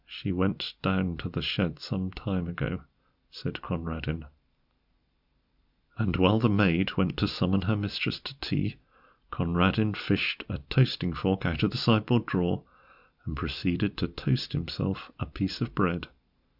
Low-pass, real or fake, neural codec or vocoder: 5.4 kHz; real; none